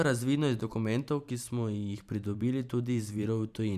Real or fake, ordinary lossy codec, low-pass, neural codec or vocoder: fake; none; 14.4 kHz; vocoder, 44.1 kHz, 128 mel bands every 512 samples, BigVGAN v2